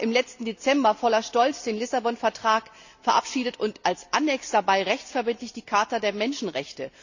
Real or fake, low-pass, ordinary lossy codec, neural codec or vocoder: real; 7.2 kHz; none; none